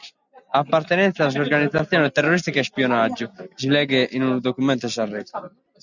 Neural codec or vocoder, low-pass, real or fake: none; 7.2 kHz; real